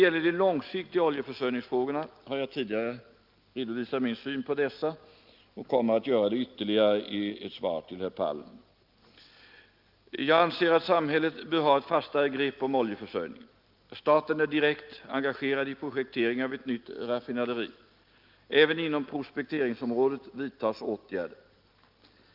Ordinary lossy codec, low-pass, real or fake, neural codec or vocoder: Opus, 24 kbps; 5.4 kHz; real; none